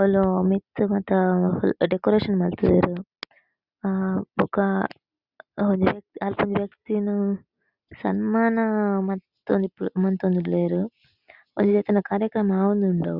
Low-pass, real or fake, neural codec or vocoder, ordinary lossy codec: 5.4 kHz; real; none; Opus, 64 kbps